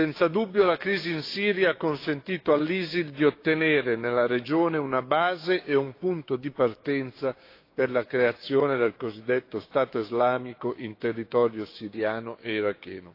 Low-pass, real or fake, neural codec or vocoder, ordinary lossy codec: 5.4 kHz; fake; codec, 16 kHz, 6 kbps, DAC; AAC, 32 kbps